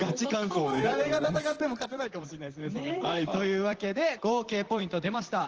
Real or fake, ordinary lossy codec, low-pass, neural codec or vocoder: fake; Opus, 16 kbps; 7.2 kHz; vocoder, 44.1 kHz, 128 mel bands, Pupu-Vocoder